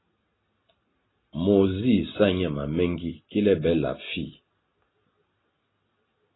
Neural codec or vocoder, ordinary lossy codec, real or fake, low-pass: none; AAC, 16 kbps; real; 7.2 kHz